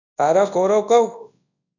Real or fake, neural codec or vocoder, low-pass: fake; codec, 24 kHz, 0.9 kbps, WavTokenizer, large speech release; 7.2 kHz